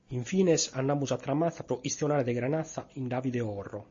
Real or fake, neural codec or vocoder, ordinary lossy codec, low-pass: real; none; MP3, 32 kbps; 7.2 kHz